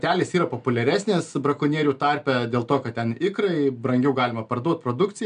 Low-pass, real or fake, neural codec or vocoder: 9.9 kHz; real; none